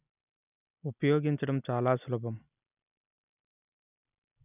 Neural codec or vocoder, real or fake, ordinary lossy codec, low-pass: none; real; none; 3.6 kHz